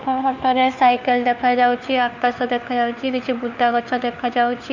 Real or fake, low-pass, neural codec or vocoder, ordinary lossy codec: fake; 7.2 kHz; codec, 16 kHz, 4 kbps, FunCodec, trained on LibriTTS, 50 frames a second; none